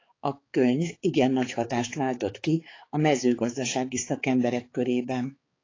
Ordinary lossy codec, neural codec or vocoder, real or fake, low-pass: AAC, 32 kbps; codec, 16 kHz, 4 kbps, X-Codec, HuBERT features, trained on balanced general audio; fake; 7.2 kHz